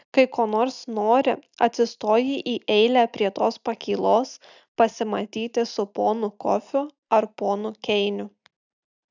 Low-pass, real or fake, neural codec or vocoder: 7.2 kHz; real; none